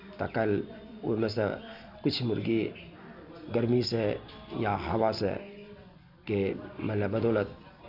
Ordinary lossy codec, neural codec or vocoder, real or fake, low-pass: none; none; real; 5.4 kHz